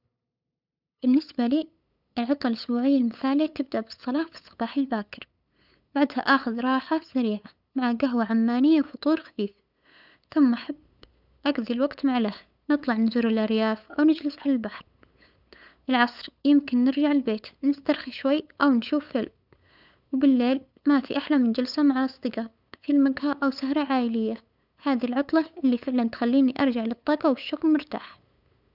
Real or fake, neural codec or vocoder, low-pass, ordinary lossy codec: fake; codec, 16 kHz, 8 kbps, FunCodec, trained on LibriTTS, 25 frames a second; 5.4 kHz; none